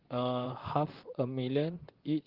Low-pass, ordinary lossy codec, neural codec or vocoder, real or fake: 5.4 kHz; Opus, 16 kbps; vocoder, 44.1 kHz, 128 mel bands, Pupu-Vocoder; fake